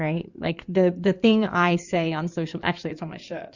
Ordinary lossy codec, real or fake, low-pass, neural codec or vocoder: Opus, 64 kbps; fake; 7.2 kHz; codec, 16 kHz in and 24 kHz out, 2.2 kbps, FireRedTTS-2 codec